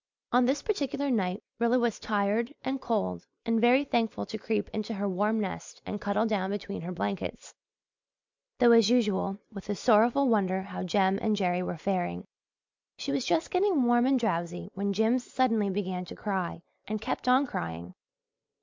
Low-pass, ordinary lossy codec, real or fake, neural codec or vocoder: 7.2 kHz; MP3, 64 kbps; real; none